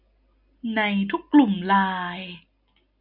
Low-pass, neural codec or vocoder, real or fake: 5.4 kHz; none; real